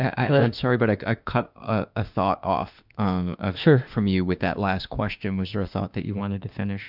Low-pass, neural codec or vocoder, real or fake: 5.4 kHz; autoencoder, 48 kHz, 32 numbers a frame, DAC-VAE, trained on Japanese speech; fake